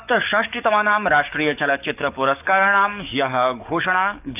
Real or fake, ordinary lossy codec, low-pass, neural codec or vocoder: fake; none; 3.6 kHz; codec, 44.1 kHz, 7.8 kbps, DAC